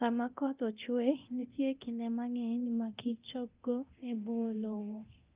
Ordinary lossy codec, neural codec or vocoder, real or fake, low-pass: Opus, 24 kbps; codec, 24 kHz, 0.9 kbps, DualCodec; fake; 3.6 kHz